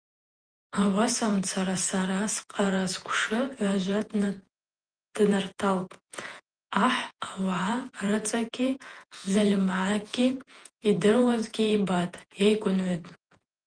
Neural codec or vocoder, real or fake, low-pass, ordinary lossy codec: vocoder, 48 kHz, 128 mel bands, Vocos; fake; 9.9 kHz; Opus, 24 kbps